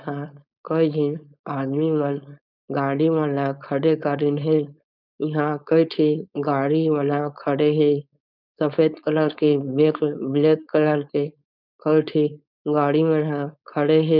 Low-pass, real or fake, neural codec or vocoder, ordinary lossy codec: 5.4 kHz; fake; codec, 16 kHz, 4.8 kbps, FACodec; none